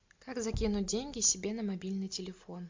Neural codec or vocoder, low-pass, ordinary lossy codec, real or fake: none; 7.2 kHz; MP3, 64 kbps; real